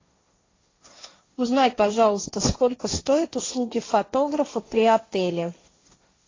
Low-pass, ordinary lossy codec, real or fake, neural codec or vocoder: 7.2 kHz; AAC, 32 kbps; fake; codec, 16 kHz, 1.1 kbps, Voila-Tokenizer